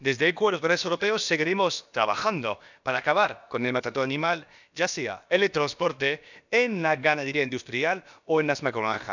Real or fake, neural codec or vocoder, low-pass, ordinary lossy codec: fake; codec, 16 kHz, about 1 kbps, DyCAST, with the encoder's durations; 7.2 kHz; none